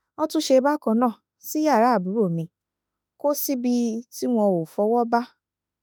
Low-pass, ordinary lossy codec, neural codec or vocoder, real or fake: none; none; autoencoder, 48 kHz, 32 numbers a frame, DAC-VAE, trained on Japanese speech; fake